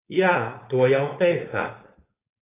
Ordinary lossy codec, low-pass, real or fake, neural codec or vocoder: AAC, 16 kbps; 3.6 kHz; fake; codec, 16 kHz, 4.8 kbps, FACodec